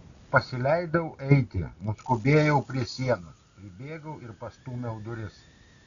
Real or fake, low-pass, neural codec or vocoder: real; 7.2 kHz; none